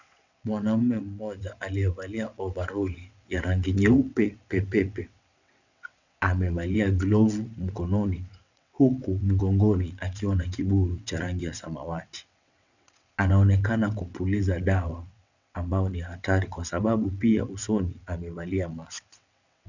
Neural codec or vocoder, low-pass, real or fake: vocoder, 22.05 kHz, 80 mel bands, WaveNeXt; 7.2 kHz; fake